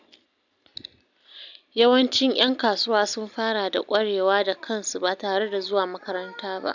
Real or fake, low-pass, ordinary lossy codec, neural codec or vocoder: real; 7.2 kHz; none; none